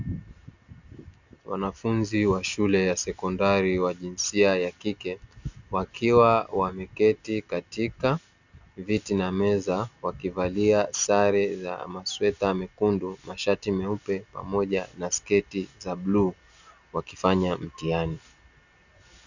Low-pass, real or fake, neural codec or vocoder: 7.2 kHz; real; none